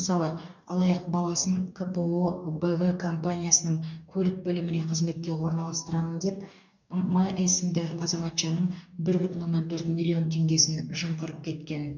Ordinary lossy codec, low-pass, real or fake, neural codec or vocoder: none; 7.2 kHz; fake; codec, 44.1 kHz, 2.6 kbps, DAC